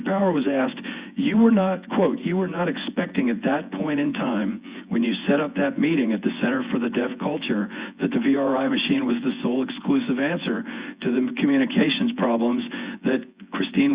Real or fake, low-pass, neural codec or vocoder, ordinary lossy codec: fake; 3.6 kHz; vocoder, 24 kHz, 100 mel bands, Vocos; Opus, 64 kbps